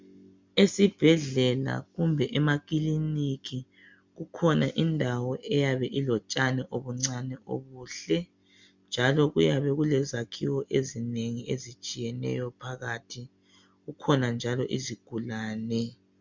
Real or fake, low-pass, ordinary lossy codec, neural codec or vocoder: real; 7.2 kHz; MP3, 64 kbps; none